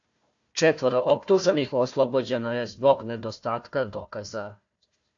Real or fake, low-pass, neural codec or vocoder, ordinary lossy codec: fake; 7.2 kHz; codec, 16 kHz, 1 kbps, FunCodec, trained on Chinese and English, 50 frames a second; AAC, 48 kbps